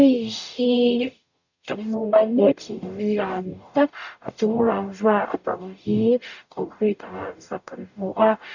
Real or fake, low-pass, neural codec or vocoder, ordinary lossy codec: fake; 7.2 kHz; codec, 44.1 kHz, 0.9 kbps, DAC; none